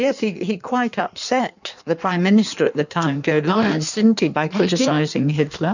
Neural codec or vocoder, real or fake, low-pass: codec, 16 kHz in and 24 kHz out, 1.1 kbps, FireRedTTS-2 codec; fake; 7.2 kHz